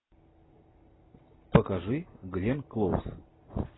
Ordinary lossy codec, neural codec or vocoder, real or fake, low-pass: AAC, 16 kbps; none; real; 7.2 kHz